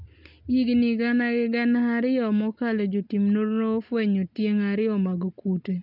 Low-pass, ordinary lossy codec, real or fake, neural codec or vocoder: 5.4 kHz; none; real; none